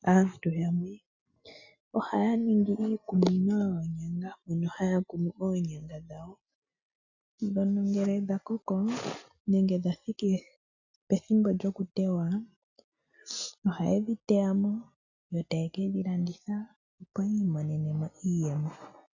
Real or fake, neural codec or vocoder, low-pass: real; none; 7.2 kHz